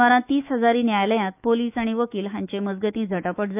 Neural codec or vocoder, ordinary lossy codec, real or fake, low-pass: autoencoder, 48 kHz, 128 numbers a frame, DAC-VAE, trained on Japanese speech; none; fake; 3.6 kHz